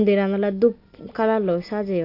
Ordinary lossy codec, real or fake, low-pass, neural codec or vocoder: AAC, 32 kbps; real; 5.4 kHz; none